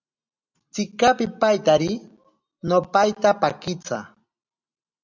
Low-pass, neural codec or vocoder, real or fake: 7.2 kHz; none; real